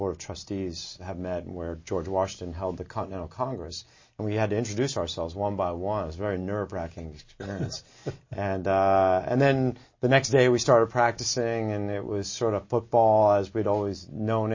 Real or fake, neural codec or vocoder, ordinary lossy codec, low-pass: real; none; MP3, 32 kbps; 7.2 kHz